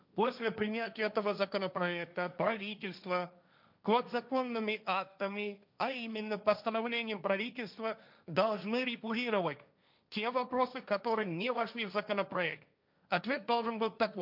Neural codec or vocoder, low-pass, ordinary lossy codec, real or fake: codec, 16 kHz, 1.1 kbps, Voila-Tokenizer; 5.4 kHz; none; fake